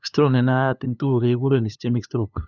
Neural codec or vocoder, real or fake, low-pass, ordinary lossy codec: codec, 16 kHz, 2 kbps, FunCodec, trained on LibriTTS, 25 frames a second; fake; 7.2 kHz; none